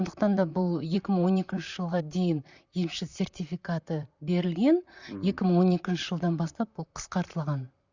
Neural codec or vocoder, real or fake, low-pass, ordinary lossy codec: vocoder, 44.1 kHz, 128 mel bands, Pupu-Vocoder; fake; 7.2 kHz; Opus, 64 kbps